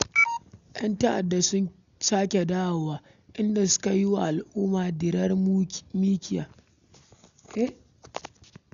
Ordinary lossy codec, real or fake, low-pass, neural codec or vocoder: none; real; 7.2 kHz; none